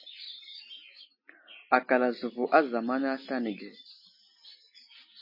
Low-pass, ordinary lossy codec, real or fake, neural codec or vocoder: 5.4 kHz; MP3, 24 kbps; real; none